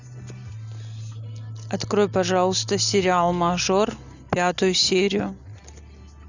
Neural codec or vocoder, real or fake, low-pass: none; real; 7.2 kHz